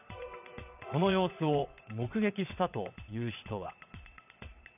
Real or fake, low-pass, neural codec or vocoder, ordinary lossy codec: fake; 3.6 kHz; vocoder, 22.05 kHz, 80 mel bands, WaveNeXt; none